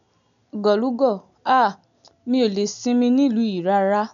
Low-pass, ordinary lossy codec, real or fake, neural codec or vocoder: 7.2 kHz; none; real; none